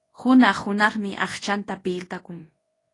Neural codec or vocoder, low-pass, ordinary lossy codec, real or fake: codec, 24 kHz, 0.9 kbps, WavTokenizer, large speech release; 10.8 kHz; AAC, 32 kbps; fake